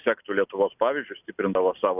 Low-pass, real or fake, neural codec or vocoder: 3.6 kHz; real; none